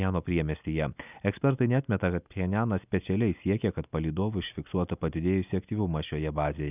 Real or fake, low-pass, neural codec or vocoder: real; 3.6 kHz; none